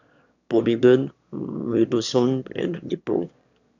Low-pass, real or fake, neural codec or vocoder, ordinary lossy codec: 7.2 kHz; fake; autoencoder, 22.05 kHz, a latent of 192 numbers a frame, VITS, trained on one speaker; none